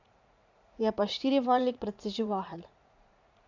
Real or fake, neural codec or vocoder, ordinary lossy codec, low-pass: fake; vocoder, 44.1 kHz, 80 mel bands, Vocos; MP3, 64 kbps; 7.2 kHz